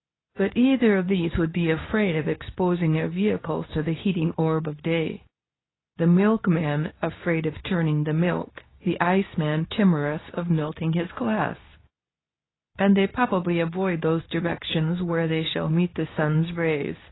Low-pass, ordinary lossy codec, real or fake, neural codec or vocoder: 7.2 kHz; AAC, 16 kbps; fake; codec, 24 kHz, 0.9 kbps, WavTokenizer, medium speech release version 2